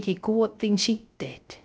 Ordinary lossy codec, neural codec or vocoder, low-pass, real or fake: none; codec, 16 kHz, 0.3 kbps, FocalCodec; none; fake